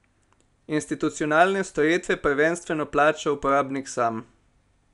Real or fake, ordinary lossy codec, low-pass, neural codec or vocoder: real; none; 10.8 kHz; none